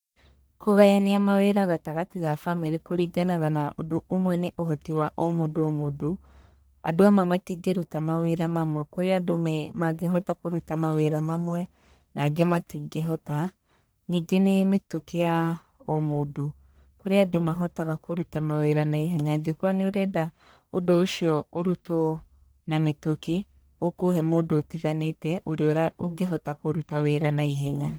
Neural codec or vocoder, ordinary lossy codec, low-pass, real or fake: codec, 44.1 kHz, 1.7 kbps, Pupu-Codec; none; none; fake